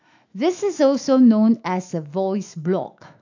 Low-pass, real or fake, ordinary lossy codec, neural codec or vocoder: 7.2 kHz; fake; AAC, 48 kbps; autoencoder, 48 kHz, 128 numbers a frame, DAC-VAE, trained on Japanese speech